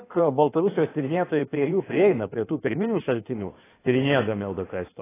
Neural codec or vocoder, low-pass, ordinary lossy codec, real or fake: codec, 16 kHz in and 24 kHz out, 1.1 kbps, FireRedTTS-2 codec; 3.6 kHz; AAC, 16 kbps; fake